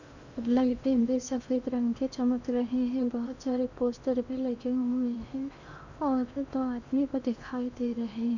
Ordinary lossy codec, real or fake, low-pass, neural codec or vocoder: none; fake; 7.2 kHz; codec, 16 kHz in and 24 kHz out, 0.8 kbps, FocalCodec, streaming, 65536 codes